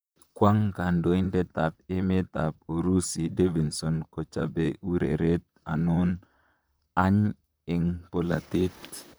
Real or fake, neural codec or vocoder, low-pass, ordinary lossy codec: fake; vocoder, 44.1 kHz, 128 mel bands, Pupu-Vocoder; none; none